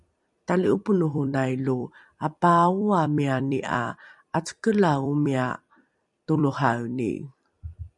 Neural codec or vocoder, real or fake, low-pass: vocoder, 44.1 kHz, 128 mel bands every 256 samples, BigVGAN v2; fake; 10.8 kHz